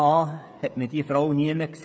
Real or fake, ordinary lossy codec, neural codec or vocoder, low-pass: fake; none; codec, 16 kHz, 16 kbps, FreqCodec, smaller model; none